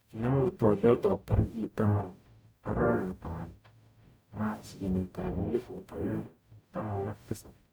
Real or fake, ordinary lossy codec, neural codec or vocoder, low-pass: fake; none; codec, 44.1 kHz, 0.9 kbps, DAC; none